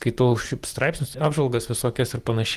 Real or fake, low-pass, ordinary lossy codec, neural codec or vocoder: real; 14.4 kHz; Opus, 24 kbps; none